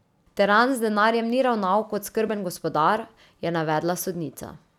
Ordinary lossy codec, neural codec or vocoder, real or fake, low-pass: none; none; real; 19.8 kHz